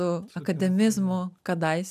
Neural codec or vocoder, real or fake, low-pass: none; real; 14.4 kHz